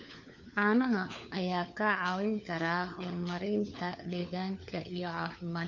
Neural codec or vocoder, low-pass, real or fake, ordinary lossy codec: codec, 16 kHz, 4 kbps, FunCodec, trained on LibriTTS, 50 frames a second; 7.2 kHz; fake; none